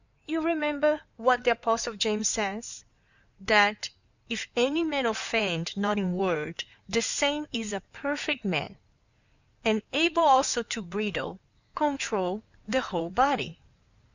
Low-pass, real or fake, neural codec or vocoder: 7.2 kHz; fake; codec, 16 kHz in and 24 kHz out, 2.2 kbps, FireRedTTS-2 codec